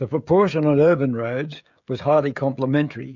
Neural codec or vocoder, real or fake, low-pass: codec, 16 kHz, 16 kbps, FreqCodec, smaller model; fake; 7.2 kHz